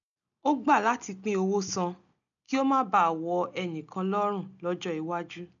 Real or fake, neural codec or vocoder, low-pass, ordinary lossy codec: real; none; 7.2 kHz; none